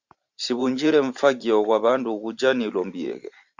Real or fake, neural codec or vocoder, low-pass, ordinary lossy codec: fake; vocoder, 44.1 kHz, 128 mel bands every 512 samples, BigVGAN v2; 7.2 kHz; Opus, 64 kbps